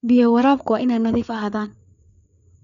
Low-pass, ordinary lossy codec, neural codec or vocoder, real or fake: 7.2 kHz; none; codec, 16 kHz, 4 kbps, FreqCodec, larger model; fake